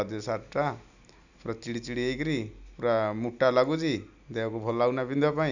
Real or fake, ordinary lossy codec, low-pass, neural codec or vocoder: real; none; 7.2 kHz; none